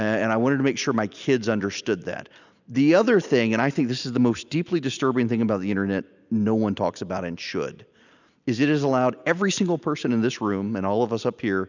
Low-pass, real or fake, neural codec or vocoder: 7.2 kHz; real; none